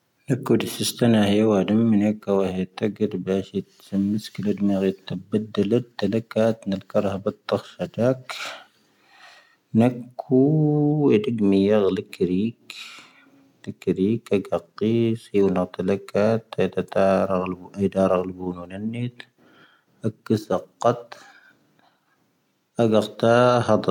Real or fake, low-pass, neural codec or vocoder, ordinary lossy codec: real; 19.8 kHz; none; none